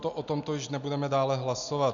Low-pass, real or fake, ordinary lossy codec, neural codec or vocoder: 7.2 kHz; real; AAC, 64 kbps; none